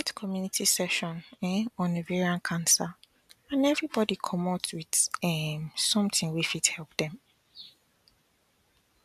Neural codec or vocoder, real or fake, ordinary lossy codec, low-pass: none; real; none; 14.4 kHz